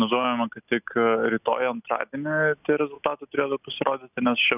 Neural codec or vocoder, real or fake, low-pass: none; real; 3.6 kHz